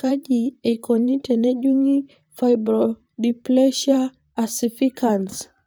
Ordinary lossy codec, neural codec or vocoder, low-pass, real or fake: none; vocoder, 44.1 kHz, 128 mel bands, Pupu-Vocoder; none; fake